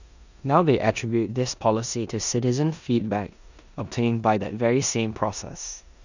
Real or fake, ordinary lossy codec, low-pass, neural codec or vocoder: fake; none; 7.2 kHz; codec, 16 kHz in and 24 kHz out, 0.9 kbps, LongCat-Audio-Codec, four codebook decoder